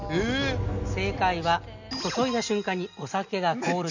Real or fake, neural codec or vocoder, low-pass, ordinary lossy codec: real; none; 7.2 kHz; none